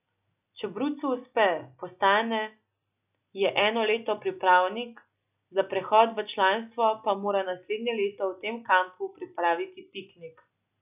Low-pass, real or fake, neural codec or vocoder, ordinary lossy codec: 3.6 kHz; real; none; none